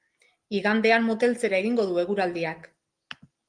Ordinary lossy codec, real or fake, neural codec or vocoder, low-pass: Opus, 24 kbps; real; none; 9.9 kHz